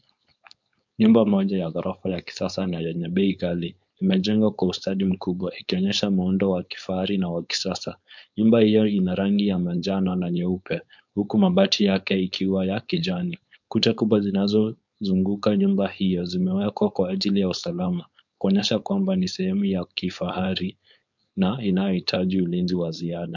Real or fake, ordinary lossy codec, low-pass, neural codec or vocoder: fake; MP3, 64 kbps; 7.2 kHz; codec, 16 kHz, 4.8 kbps, FACodec